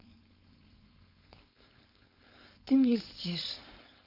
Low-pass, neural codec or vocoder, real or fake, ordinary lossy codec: 5.4 kHz; codec, 16 kHz, 4.8 kbps, FACodec; fake; none